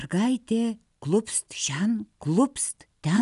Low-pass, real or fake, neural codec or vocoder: 10.8 kHz; real; none